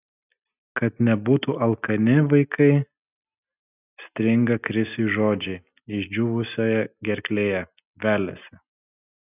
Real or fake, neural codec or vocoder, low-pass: real; none; 3.6 kHz